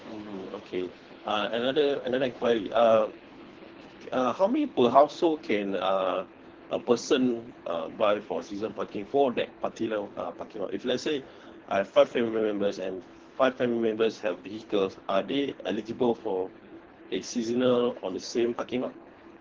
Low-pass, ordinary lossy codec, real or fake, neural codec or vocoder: 7.2 kHz; Opus, 16 kbps; fake; codec, 24 kHz, 3 kbps, HILCodec